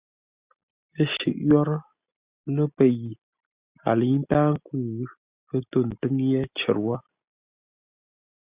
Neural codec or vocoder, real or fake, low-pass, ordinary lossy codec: none; real; 3.6 kHz; Opus, 64 kbps